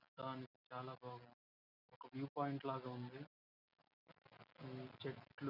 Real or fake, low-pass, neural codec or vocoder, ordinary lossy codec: real; 5.4 kHz; none; none